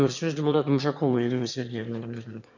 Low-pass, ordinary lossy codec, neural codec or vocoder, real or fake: 7.2 kHz; none; autoencoder, 22.05 kHz, a latent of 192 numbers a frame, VITS, trained on one speaker; fake